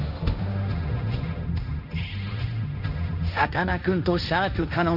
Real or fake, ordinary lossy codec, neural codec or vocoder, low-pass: fake; none; codec, 16 kHz, 1.1 kbps, Voila-Tokenizer; 5.4 kHz